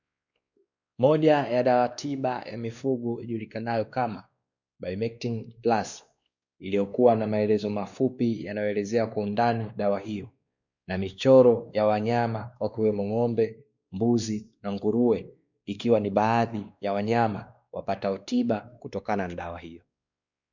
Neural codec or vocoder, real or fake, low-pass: codec, 16 kHz, 2 kbps, X-Codec, WavLM features, trained on Multilingual LibriSpeech; fake; 7.2 kHz